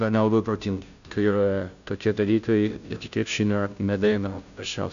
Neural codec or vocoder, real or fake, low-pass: codec, 16 kHz, 0.5 kbps, FunCodec, trained on Chinese and English, 25 frames a second; fake; 7.2 kHz